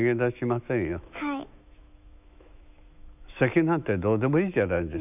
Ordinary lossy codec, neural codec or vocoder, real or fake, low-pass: none; autoencoder, 48 kHz, 128 numbers a frame, DAC-VAE, trained on Japanese speech; fake; 3.6 kHz